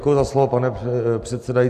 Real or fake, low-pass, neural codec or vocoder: real; 14.4 kHz; none